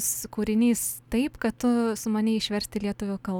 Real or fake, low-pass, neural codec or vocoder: real; 19.8 kHz; none